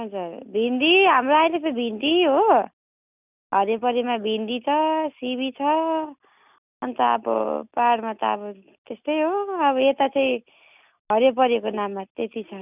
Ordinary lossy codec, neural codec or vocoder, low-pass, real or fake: none; none; 3.6 kHz; real